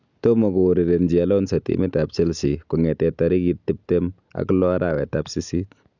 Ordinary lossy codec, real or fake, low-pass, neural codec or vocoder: none; real; 7.2 kHz; none